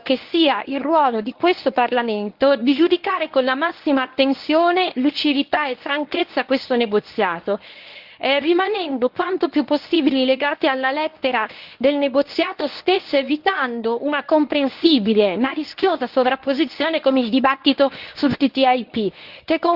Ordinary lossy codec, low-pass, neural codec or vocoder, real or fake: Opus, 32 kbps; 5.4 kHz; codec, 24 kHz, 0.9 kbps, WavTokenizer, medium speech release version 1; fake